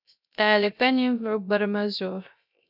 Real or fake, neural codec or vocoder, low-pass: fake; codec, 16 kHz, 0.3 kbps, FocalCodec; 5.4 kHz